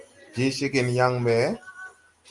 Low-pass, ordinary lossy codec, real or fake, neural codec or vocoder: 10.8 kHz; Opus, 32 kbps; real; none